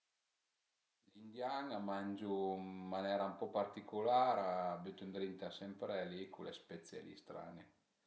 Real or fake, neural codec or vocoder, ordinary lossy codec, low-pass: real; none; none; none